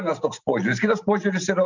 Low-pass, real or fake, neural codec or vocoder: 7.2 kHz; real; none